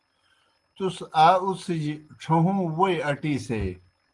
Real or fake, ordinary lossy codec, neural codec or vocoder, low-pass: real; Opus, 24 kbps; none; 10.8 kHz